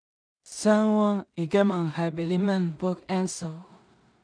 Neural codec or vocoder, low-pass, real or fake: codec, 16 kHz in and 24 kHz out, 0.4 kbps, LongCat-Audio-Codec, two codebook decoder; 9.9 kHz; fake